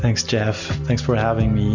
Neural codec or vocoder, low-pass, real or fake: none; 7.2 kHz; real